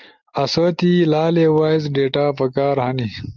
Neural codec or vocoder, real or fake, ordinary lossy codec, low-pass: none; real; Opus, 32 kbps; 7.2 kHz